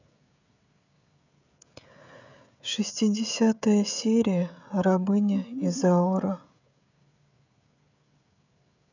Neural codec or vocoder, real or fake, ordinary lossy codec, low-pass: codec, 16 kHz, 16 kbps, FreqCodec, smaller model; fake; none; 7.2 kHz